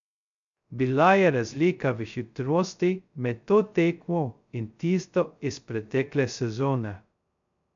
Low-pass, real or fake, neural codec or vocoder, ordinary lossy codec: 7.2 kHz; fake; codec, 16 kHz, 0.2 kbps, FocalCodec; MP3, 64 kbps